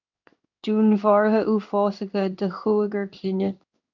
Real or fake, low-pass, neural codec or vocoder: fake; 7.2 kHz; codec, 16 kHz in and 24 kHz out, 1 kbps, XY-Tokenizer